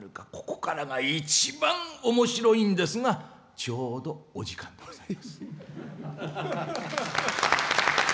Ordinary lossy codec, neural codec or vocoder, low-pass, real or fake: none; none; none; real